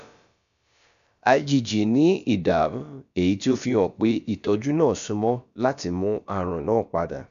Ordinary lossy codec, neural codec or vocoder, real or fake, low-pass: none; codec, 16 kHz, about 1 kbps, DyCAST, with the encoder's durations; fake; 7.2 kHz